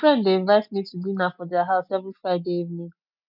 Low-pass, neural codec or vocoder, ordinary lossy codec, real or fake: 5.4 kHz; none; none; real